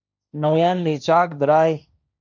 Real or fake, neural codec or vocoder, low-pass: fake; codec, 16 kHz, 1.1 kbps, Voila-Tokenizer; 7.2 kHz